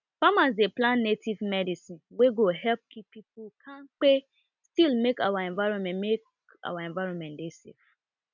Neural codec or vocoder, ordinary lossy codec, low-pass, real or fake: none; none; 7.2 kHz; real